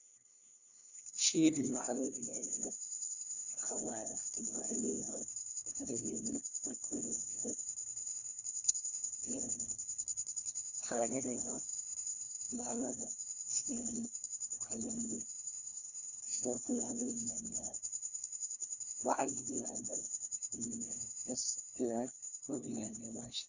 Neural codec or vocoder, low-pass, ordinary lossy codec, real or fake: codec, 16 kHz, 1 kbps, FunCodec, trained on LibriTTS, 50 frames a second; 7.2 kHz; none; fake